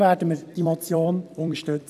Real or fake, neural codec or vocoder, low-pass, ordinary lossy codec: fake; vocoder, 44.1 kHz, 128 mel bands every 256 samples, BigVGAN v2; 14.4 kHz; none